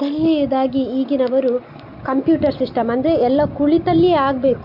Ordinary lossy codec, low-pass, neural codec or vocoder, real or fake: none; 5.4 kHz; none; real